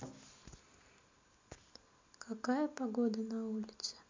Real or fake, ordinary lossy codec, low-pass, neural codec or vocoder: real; MP3, 48 kbps; 7.2 kHz; none